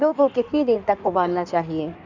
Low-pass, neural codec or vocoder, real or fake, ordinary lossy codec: 7.2 kHz; codec, 16 kHz in and 24 kHz out, 1.1 kbps, FireRedTTS-2 codec; fake; none